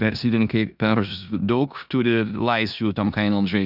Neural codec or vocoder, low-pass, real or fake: codec, 16 kHz in and 24 kHz out, 0.9 kbps, LongCat-Audio-Codec, four codebook decoder; 5.4 kHz; fake